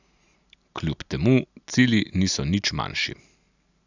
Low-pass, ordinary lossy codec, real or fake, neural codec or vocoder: 7.2 kHz; none; real; none